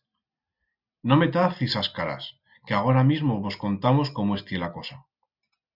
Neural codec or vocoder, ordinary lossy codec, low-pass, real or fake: none; Opus, 64 kbps; 5.4 kHz; real